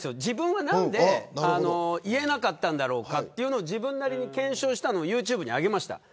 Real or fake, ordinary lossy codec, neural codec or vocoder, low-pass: real; none; none; none